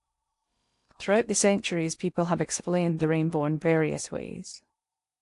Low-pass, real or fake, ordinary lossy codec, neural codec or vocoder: 10.8 kHz; fake; AAC, 64 kbps; codec, 16 kHz in and 24 kHz out, 0.8 kbps, FocalCodec, streaming, 65536 codes